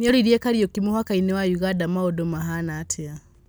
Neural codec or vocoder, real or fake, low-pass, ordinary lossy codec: none; real; none; none